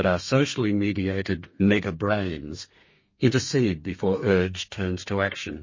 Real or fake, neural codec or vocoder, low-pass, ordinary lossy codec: fake; codec, 32 kHz, 1.9 kbps, SNAC; 7.2 kHz; MP3, 32 kbps